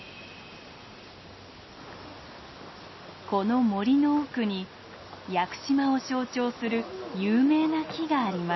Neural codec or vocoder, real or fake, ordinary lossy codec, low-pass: none; real; MP3, 24 kbps; 7.2 kHz